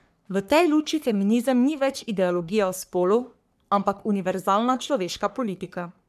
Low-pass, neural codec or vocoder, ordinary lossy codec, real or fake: 14.4 kHz; codec, 44.1 kHz, 3.4 kbps, Pupu-Codec; none; fake